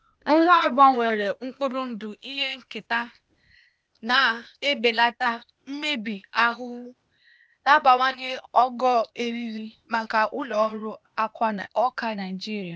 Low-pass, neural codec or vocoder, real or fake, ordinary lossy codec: none; codec, 16 kHz, 0.8 kbps, ZipCodec; fake; none